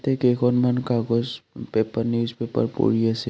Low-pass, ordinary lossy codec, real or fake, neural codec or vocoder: none; none; real; none